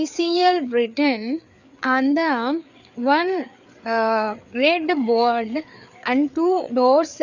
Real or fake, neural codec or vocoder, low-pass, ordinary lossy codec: fake; codec, 16 kHz, 4 kbps, FreqCodec, larger model; 7.2 kHz; none